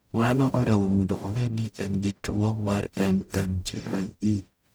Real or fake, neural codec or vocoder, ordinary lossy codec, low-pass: fake; codec, 44.1 kHz, 0.9 kbps, DAC; none; none